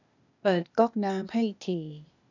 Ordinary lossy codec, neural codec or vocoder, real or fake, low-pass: none; codec, 16 kHz, 0.8 kbps, ZipCodec; fake; 7.2 kHz